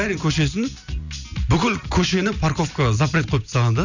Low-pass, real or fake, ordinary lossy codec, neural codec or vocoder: 7.2 kHz; real; none; none